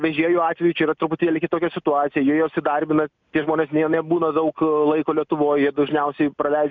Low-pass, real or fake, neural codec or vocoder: 7.2 kHz; real; none